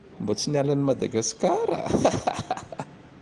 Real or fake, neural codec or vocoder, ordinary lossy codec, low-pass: real; none; Opus, 24 kbps; 10.8 kHz